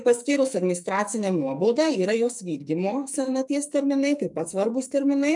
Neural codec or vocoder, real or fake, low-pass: codec, 44.1 kHz, 2.6 kbps, SNAC; fake; 10.8 kHz